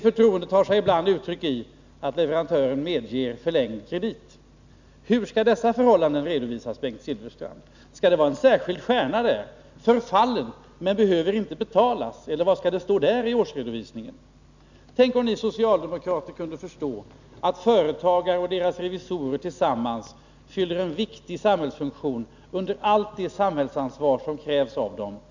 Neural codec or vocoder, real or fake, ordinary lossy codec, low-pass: none; real; none; 7.2 kHz